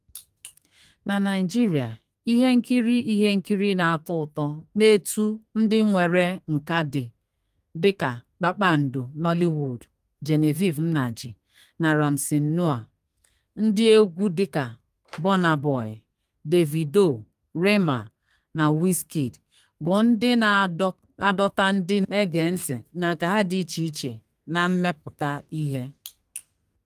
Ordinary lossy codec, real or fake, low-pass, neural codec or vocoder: Opus, 32 kbps; fake; 14.4 kHz; codec, 32 kHz, 1.9 kbps, SNAC